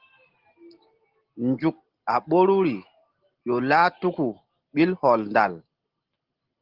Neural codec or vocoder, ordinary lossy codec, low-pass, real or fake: none; Opus, 16 kbps; 5.4 kHz; real